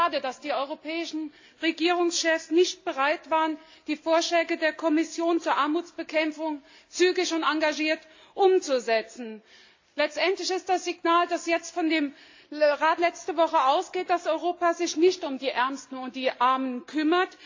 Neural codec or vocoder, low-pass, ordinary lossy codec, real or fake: none; 7.2 kHz; AAC, 48 kbps; real